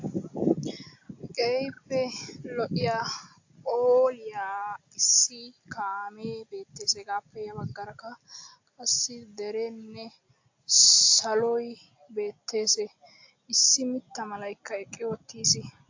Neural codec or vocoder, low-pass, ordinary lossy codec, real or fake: none; 7.2 kHz; AAC, 48 kbps; real